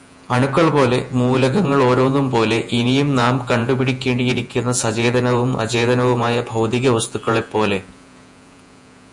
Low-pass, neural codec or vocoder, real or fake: 10.8 kHz; vocoder, 48 kHz, 128 mel bands, Vocos; fake